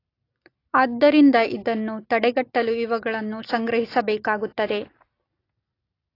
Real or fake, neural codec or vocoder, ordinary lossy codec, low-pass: real; none; AAC, 32 kbps; 5.4 kHz